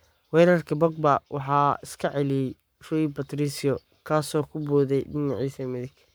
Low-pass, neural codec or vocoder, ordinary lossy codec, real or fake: none; codec, 44.1 kHz, 7.8 kbps, Pupu-Codec; none; fake